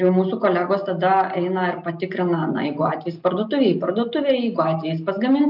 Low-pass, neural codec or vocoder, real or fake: 5.4 kHz; none; real